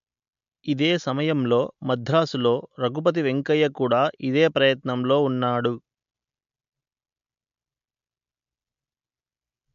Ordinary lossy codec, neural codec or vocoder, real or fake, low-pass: MP3, 64 kbps; none; real; 7.2 kHz